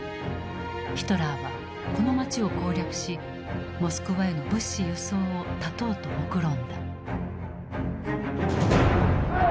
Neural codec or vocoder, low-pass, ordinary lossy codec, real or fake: none; none; none; real